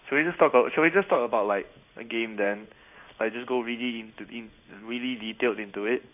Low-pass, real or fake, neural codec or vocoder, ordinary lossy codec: 3.6 kHz; fake; codec, 16 kHz in and 24 kHz out, 1 kbps, XY-Tokenizer; none